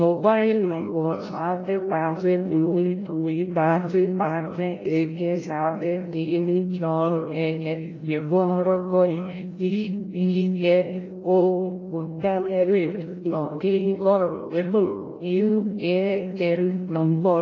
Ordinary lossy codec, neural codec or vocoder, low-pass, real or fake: AAC, 32 kbps; codec, 16 kHz, 0.5 kbps, FreqCodec, larger model; 7.2 kHz; fake